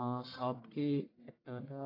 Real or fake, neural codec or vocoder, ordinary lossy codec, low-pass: fake; codec, 44.1 kHz, 1.7 kbps, Pupu-Codec; AAC, 24 kbps; 5.4 kHz